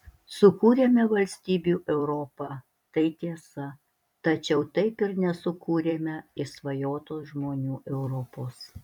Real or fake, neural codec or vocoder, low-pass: fake; vocoder, 44.1 kHz, 128 mel bands every 256 samples, BigVGAN v2; 19.8 kHz